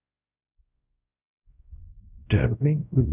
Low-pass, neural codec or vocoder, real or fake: 3.6 kHz; codec, 16 kHz, 0.5 kbps, X-Codec, WavLM features, trained on Multilingual LibriSpeech; fake